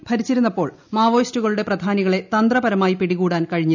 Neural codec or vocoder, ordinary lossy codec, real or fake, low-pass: none; none; real; 7.2 kHz